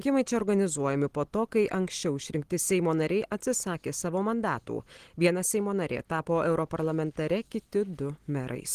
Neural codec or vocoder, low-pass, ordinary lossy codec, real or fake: none; 14.4 kHz; Opus, 16 kbps; real